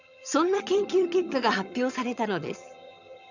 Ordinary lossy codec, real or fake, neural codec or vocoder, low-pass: none; fake; vocoder, 22.05 kHz, 80 mel bands, HiFi-GAN; 7.2 kHz